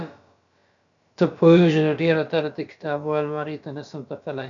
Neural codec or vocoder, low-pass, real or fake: codec, 16 kHz, about 1 kbps, DyCAST, with the encoder's durations; 7.2 kHz; fake